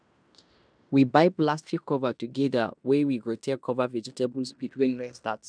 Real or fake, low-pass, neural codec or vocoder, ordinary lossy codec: fake; 9.9 kHz; codec, 16 kHz in and 24 kHz out, 0.9 kbps, LongCat-Audio-Codec, fine tuned four codebook decoder; none